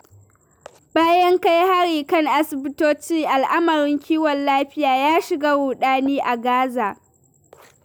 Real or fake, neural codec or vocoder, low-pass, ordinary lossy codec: real; none; none; none